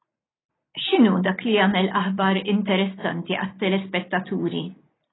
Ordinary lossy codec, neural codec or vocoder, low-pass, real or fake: AAC, 16 kbps; none; 7.2 kHz; real